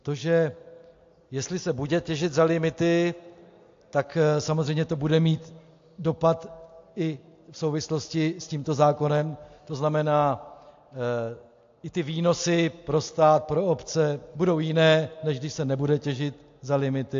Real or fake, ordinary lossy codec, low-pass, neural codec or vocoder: real; AAC, 48 kbps; 7.2 kHz; none